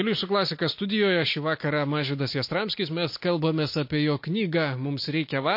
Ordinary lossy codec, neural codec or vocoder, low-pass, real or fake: MP3, 32 kbps; none; 5.4 kHz; real